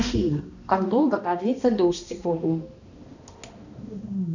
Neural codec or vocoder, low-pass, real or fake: codec, 16 kHz, 1 kbps, X-Codec, HuBERT features, trained on balanced general audio; 7.2 kHz; fake